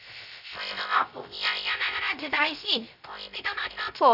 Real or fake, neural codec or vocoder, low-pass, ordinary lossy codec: fake; codec, 16 kHz, 0.3 kbps, FocalCodec; 5.4 kHz; none